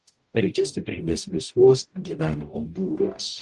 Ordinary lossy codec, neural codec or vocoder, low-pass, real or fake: Opus, 24 kbps; codec, 44.1 kHz, 0.9 kbps, DAC; 10.8 kHz; fake